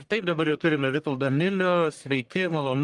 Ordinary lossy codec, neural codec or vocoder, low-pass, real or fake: Opus, 32 kbps; codec, 44.1 kHz, 1.7 kbps, Pupu-Codec; 10.8 kHz; fake